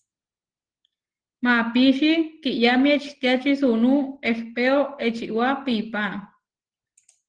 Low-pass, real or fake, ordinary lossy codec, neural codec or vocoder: 9.9 kHz; real; Opus, 16 kbps; none